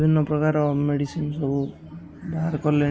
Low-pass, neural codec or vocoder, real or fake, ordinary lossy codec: none; none; real; none